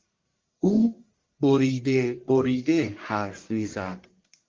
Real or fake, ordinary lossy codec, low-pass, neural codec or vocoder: fake; Opus, 32 kbps; 7.2 kHz; codec, 44.1 kHz, 1.7 kbps, Pupu-Codec